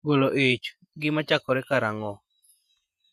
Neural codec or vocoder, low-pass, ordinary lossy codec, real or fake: vocoder, 48 kHz, 128 mel bands, Vocos; 14.4 kHz; none; fake